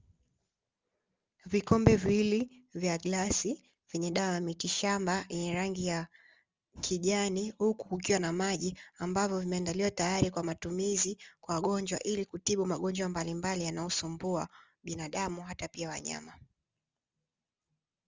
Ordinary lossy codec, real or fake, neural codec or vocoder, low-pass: Opus, 32 kbps; real; none; 7.2 kHz